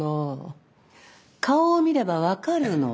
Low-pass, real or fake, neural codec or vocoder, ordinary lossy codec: none; real; none; none